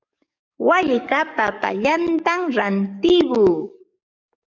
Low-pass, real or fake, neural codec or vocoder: 7.2 kHz; fake; codec, 44.1 kHz, 7.8 kbps, DAC